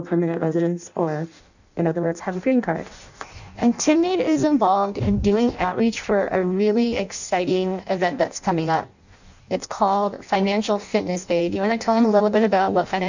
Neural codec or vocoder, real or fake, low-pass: codec, 16 kHz in and 24 kHz out, 0.6 kbps, FireRedTTS-2 codec; fake; 7.2 kHz